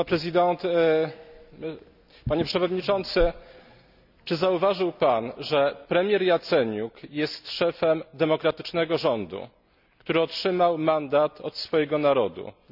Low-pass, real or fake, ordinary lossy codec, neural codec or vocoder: 5.4 kHz; real; none; none